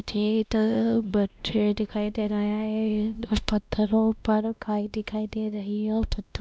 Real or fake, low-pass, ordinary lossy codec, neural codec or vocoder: fake; none; none; codec, 16 kHz, 1 kbps, X-Codec, WavLM features, trained on Multilingual LibriSpeech